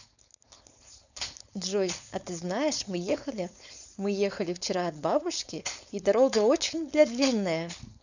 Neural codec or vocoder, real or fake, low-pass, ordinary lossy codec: codec, 16 kHz, 4.8 kbps, FACodec; fake; 7.2 kHz; none